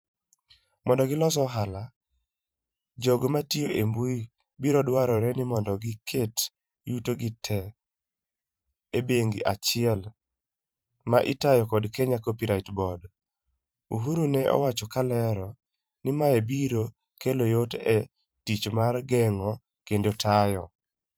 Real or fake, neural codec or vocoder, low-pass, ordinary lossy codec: fake; vocoder, 44.1 kHz, 128 mel bands every 512 samples, BigVGAN v2; none; none